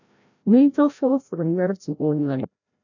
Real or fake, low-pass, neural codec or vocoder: fake; 7.2 kHz; codec, 16 kHz, 0.5 kbps, FreqCodec, larger model